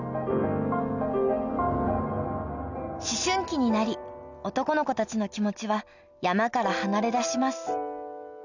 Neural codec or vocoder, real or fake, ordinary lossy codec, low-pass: none; real; none; 7.2 kHz